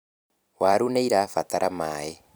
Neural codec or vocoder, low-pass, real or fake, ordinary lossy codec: vocoder, 44.1 kHz, 128 mel bands every 256 samples, BigVGAN v2; none; fake; none